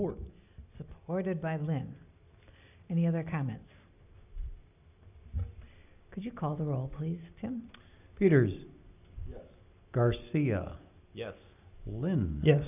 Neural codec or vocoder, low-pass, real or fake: none; 3.6 kHz; real